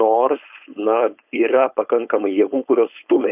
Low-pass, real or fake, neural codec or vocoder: 3.6 kHz; fake; codec, 16 kHz, 4.8 kbps, FACodec